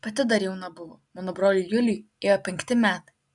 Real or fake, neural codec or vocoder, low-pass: real; none; 10.8 kHz